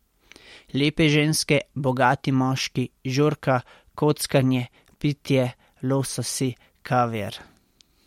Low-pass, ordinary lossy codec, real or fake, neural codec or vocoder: 19.8 kHz; MP3, 64 kbps; real; none